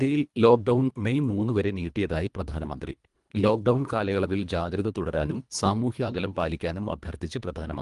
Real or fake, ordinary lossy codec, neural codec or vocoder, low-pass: fake; Opus, 32 kbps; codec, 24 kHz, 1.5 kbps, HILCodec; 10.8 kHz